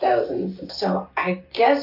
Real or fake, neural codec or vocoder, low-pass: real; none; 5.4 kHz